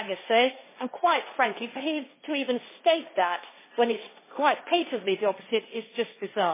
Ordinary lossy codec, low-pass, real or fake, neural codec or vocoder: MP3, 16 kbps; 3.6 kHz; fake; codec, 16 kHz, 1.1 kbps, Voila-Tokenizer